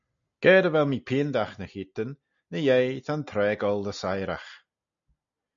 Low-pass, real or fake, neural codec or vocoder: 7.2 kHz; real; none